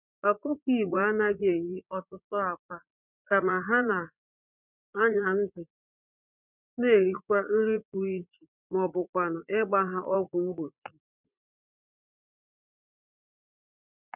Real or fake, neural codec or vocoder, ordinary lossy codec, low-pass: fake; vocoder, 44.1 kHz, 80 mel bands, Vocos; none; 3.6 kHz